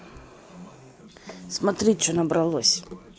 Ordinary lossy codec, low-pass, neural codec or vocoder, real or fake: none; none; none; real